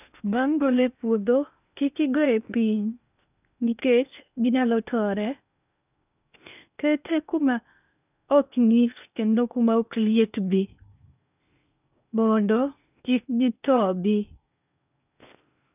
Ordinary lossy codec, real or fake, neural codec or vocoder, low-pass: none; fake; codec, 16 kHz in and 24 kHz out, 0.8 kbps, FocalCodec, streaming, 65536 codes; 3.6 kHz